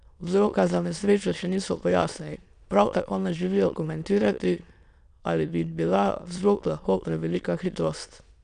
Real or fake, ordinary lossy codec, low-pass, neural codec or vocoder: fake; none; 9.9 kHz; autoencoder, 22.05 kHz, a latent of 192 numbers a frame, VITS, trained on many speakers